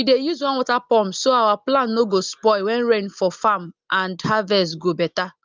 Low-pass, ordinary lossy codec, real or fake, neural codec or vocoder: 7.2 kHz; Opus, 32 kbps; real; none